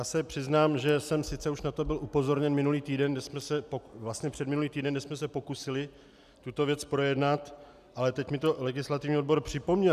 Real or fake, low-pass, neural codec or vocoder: real; 14.4 kHz; none